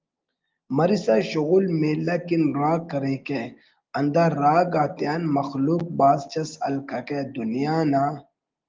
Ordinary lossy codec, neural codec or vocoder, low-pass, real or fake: Opus, 32 kbps; none; 7.2 kHz; real